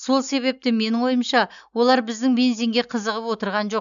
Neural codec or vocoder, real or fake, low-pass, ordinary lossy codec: none; real; 7.2 kHz; none